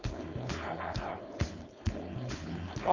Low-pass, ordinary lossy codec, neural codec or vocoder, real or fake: 7.2 kHz; none; codec, 16 kHz, 4.8 kbps, FACodec; fake